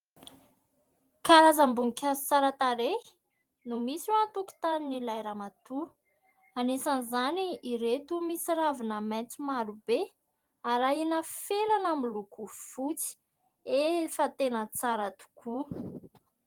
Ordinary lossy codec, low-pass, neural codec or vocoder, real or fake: Opus, 24 kbps; 19.8 kHz; vocoder, 44.1 kHz, 128 mel bands every 512 samples, BigVGAN v2; fake